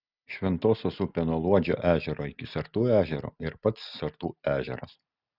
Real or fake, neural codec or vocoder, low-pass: real; none; 5.4 kHz